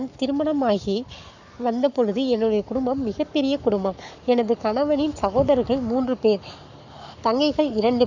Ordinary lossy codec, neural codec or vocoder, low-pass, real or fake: none; codec, 44.1 kHz, 7.8 kbps, Pupu-Codec; 7.2 kHz; fake